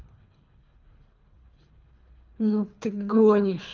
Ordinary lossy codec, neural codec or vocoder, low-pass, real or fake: Opus, 24 kbps; codec, 24 kHz, 3 kbps, HILCodec; 7.2 kHz; fake